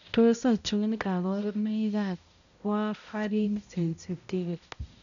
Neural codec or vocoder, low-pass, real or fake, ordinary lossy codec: codec, 16 kHz, 0.5 kbps, X-Codec, HuBERT features, trained on balanced general audio; 7.2 kHz; fake; none